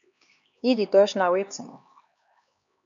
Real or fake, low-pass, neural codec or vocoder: fake; 7.2 kHz; codec, 16 kHz, 2 kbps, X-Codec, HuBERT features, trained on LibriSpeech